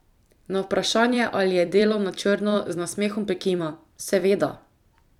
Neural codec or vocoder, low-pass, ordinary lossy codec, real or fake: vocoder, 44.1 kHz, 128 mel bands every 256 samples, BigVGAN v2; 19.8 kHz; none; fake